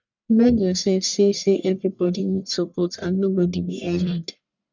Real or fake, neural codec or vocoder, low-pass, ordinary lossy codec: fake; codec, 44.1 kHz, 1.7 kbps, Pupu-Codec; 7.2 kHz; none